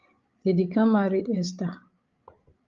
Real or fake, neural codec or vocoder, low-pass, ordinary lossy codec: fake; codec, 16 kHz, 8 kbps, FreqCodec, larger model; 7.2 kHz; Opus, 32 kbps